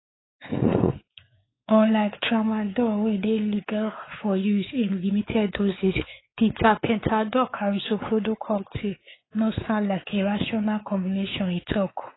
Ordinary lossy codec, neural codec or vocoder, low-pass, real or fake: AAC, 16 kbps; codec, 16 kHz, 4 kbps, X-Codec, WavLM features, trained on Multilingual LibriSpeech; 7.2 kHz; fake